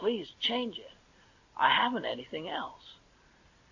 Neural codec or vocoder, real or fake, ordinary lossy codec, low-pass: none; real; AAC, 48 kbps; 7.2 kHz